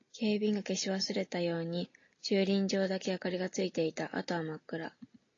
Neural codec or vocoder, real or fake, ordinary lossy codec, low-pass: none; real; AAC, 32 kbps; 7.2 kHz